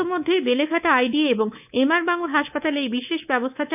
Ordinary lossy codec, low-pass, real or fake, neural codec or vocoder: none; 3.6 kHz; fake; codec, 24 kHz, 3.1 kbps, DualCodec